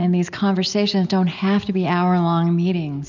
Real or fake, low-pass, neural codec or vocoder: real; 7.2 kHz; none